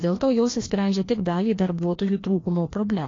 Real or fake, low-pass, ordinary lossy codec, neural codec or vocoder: fake; 7.2 kHz; AAC, 32 kbps; codec, 16 kHz, 1 kbps, FreqCodec, larger model